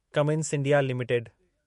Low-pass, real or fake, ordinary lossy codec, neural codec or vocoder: 14.4 kHz; fake; MP3, 48 kbps; autoencoder, 48 kHz, 128 numbers a frame, DAC-VAE, trained on Japanese speech